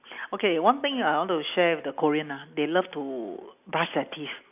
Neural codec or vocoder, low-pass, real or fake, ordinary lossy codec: none; 3.6 kHz; real; AAC, 32 kbps